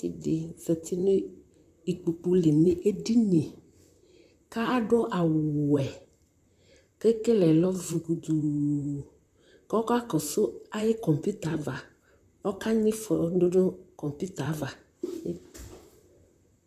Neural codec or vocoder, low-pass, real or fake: vocoder, 44.1 kHz, 128 mel bands, Pupu-Vocoder; 14.4 kHz; fake